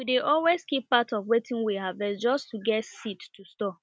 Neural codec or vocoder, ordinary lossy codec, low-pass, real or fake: none; none; none; real